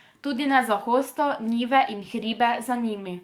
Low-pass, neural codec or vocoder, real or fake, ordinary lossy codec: 19.8 kHz; codec, 44.1 kHz, 7.8 kbps, DAC; fake; Opus, 64 kbps